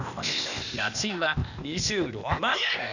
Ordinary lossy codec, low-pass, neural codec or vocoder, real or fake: none; 7.2 kHz; codec, 16 kHz, 0.8 kbps, ZipCodec; fake